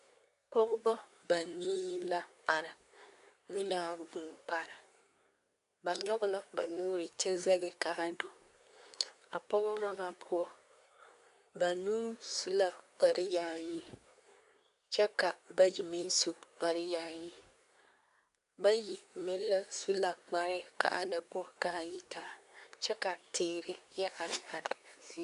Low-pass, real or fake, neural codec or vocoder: 10.8 kHz; fake; codec, 24 kHz, 1 kbps, SNAC